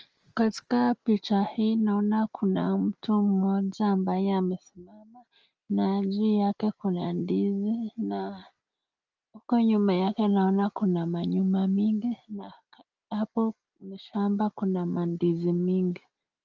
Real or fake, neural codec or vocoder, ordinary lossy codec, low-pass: real; none; Opus, 24 kbps; 7.2 kHz